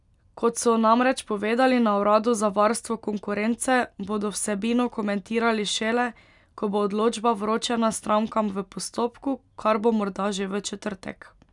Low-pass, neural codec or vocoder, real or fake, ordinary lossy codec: 10.8 kHz; none; real; none